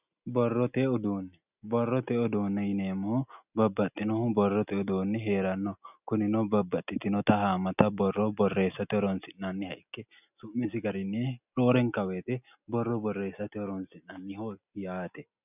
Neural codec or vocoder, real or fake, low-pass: none; real; 3.6 kHz